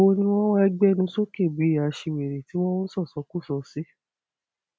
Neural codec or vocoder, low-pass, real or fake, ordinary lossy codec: none; none; real; none